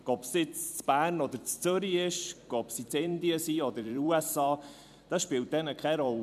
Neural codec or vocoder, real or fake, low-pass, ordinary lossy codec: vocoder, 44.1 kHz, 128 mel bands every 256 samples, BigVGAN v2; fake; 14.4 kHz; none